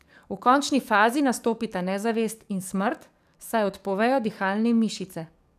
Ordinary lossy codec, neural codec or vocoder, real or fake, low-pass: none; codec, 44.1 kHz, 7.8 kbps, DAC; fake; 14.4 kHz